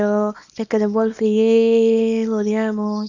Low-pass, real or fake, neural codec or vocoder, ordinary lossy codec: 7.2 kHz; fake; codec, 24 kHz, 0.9 kbps, WavTokenizer, small release; none